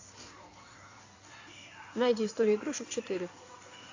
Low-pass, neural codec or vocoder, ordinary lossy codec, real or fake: 7.2 kHz; codec, 16 kHz in and 24 kHz out, 2.2 kbps, FireRedTTS-2 codec; AAC, 48 kbps; fake